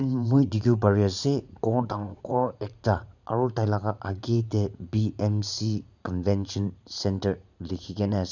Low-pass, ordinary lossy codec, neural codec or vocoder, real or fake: 7.2 kHz; none; vocoder, 44.1 kHz, 80 mel bands, Vocos; fake